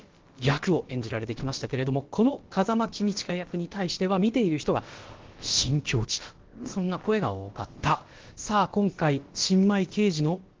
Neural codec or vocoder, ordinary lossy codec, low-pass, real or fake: codec, 16 kHz, about 1 kbps, DyCAST, with the encoder's durations; Opus, 16 kbps; 7.2 kHz; fake